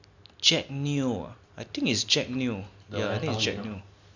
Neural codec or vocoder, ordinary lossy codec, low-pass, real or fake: none; none; 7.2 kHz; real